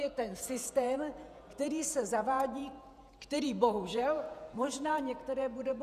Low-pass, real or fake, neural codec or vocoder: 14.4 kHz; fake; vocoder, 48 kHz, 128 mel bands, Vocos